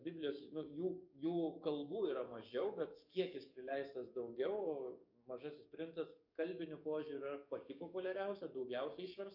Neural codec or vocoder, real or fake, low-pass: codec, 44.1 kHz, 7.8 kbps, DAC; fake; 5.4 kHz